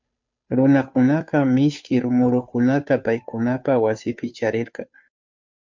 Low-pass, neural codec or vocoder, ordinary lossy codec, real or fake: 7.2 kHz; codec, 16 kHz, 2 kbps, FunCodec, trained on Chinese and English, 25 frames a second; MP3, 64 kbps; fake